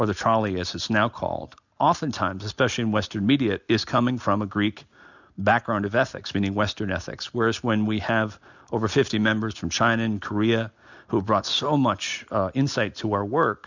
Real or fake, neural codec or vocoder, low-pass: real; none; 7.2 kHz